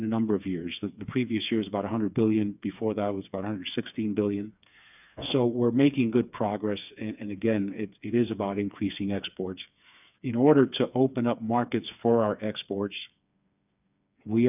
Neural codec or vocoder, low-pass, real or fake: codec, 16 kHz, 4 kbps, FreqCodec, smaller model; 3.6 kHz; fake